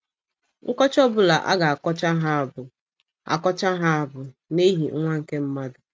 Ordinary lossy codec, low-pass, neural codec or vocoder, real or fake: none; none; none; real